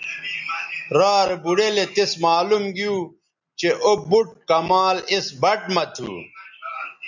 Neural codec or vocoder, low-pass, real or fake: none; 7.2 kHz; real